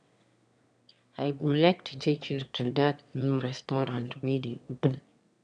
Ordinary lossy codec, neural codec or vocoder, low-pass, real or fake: none; autoencoder, 22.05 kHz, a latent of 192 numbers a frame, VITS, trained on one speaker; 9.9 kHz; fake